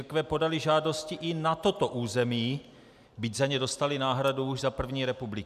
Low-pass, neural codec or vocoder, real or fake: 14.4 kHz; none; real